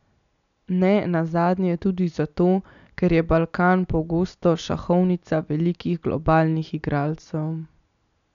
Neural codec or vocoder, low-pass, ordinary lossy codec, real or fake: none; 7.2 kHz; none; real